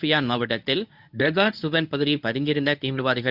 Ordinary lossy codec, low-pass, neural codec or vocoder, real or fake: none; 5.4 kHz; codec, 24 kHz, 0.9 kbps, WavTokenizer, medium speech release version 1; fake